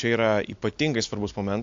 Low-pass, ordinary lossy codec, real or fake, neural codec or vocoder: 7.2 kHz; AAC, 64 kbps; real; none